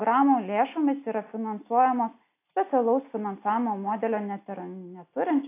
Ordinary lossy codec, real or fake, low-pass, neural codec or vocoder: AAC, 24 kbps; real; 3.6 kHz; none